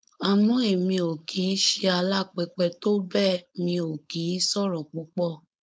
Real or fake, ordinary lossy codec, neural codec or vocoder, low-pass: fake; none; codec, 16 kHz, 4.8 kbps, FACodec; none